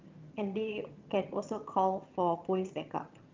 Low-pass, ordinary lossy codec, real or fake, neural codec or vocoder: 7.2 kHz; Opus, 32 kbps; fake; vocoder, 22.05 kHz, 80 mel bands, HiFi-GAN